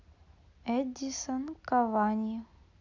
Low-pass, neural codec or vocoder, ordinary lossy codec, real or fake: 7.2 kHz; none; none; real